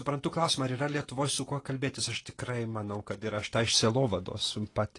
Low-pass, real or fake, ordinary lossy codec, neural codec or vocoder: 10.8 kHz; real; AAC, 32 kbps; none